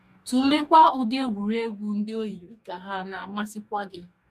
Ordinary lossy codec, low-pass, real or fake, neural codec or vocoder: MP3, 96 kbps; 14.4 kHz; fake; codec, 44.1 kHz, 2.6 kbps, DAC